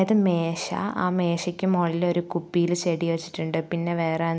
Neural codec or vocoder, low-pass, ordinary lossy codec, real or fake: none; none; none; real